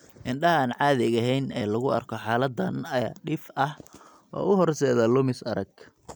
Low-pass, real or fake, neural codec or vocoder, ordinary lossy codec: none; real; none; none